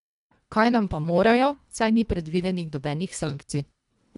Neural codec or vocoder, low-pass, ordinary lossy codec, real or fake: codec, 24 kHz, 1.5 kbps, HILCodec; 10.8 kHz; none; fake